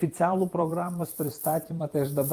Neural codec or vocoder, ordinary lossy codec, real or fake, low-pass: vocoder, 44.1 kHz, 128 mel bands every 256 samples, BigVGAN v2; Opus, 32 kbps; fake; 14.4 kHz